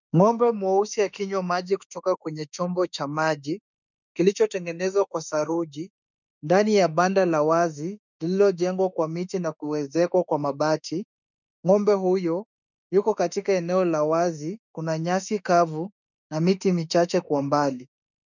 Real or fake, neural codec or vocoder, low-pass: fake; autoencoder, 48 kHz, 32 numbers a frame, DAC-VAE, trained on Japanese speech; 7.2 kHz